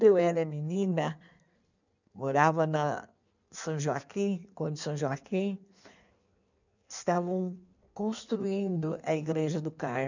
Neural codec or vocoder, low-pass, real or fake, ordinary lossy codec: codec, 16 kHz in and 24 kHz out, 1.1 kbps, FireRedTTS-2 codec; 7.2 kHz; fake; none